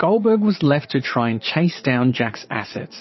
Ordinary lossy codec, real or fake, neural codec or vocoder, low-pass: MP3, 24 kbps; fake; vocoder, 22.05 kHz, 80 mel bands, Vocos; 7.2 kHz